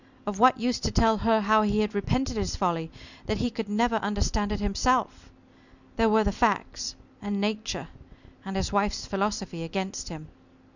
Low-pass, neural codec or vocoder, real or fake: 7.2 kHz; none; real